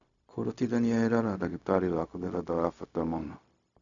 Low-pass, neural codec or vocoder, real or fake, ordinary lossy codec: 7.2 kHz; codec, 16 kHz, 0.4 kbps, LongCat-Audio-Codec; fake; none